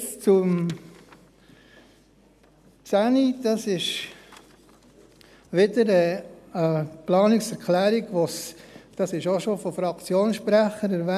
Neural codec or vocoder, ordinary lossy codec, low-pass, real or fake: none; none; 14.4 kHz; real